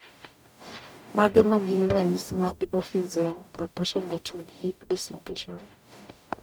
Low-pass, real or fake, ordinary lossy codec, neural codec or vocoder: none; fake; none; codec, 44.1 kHz, 0.9 kbps, DAC